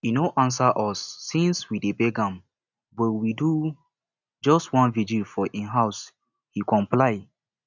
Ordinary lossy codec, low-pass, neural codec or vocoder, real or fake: none; 7.2 kHz; none; real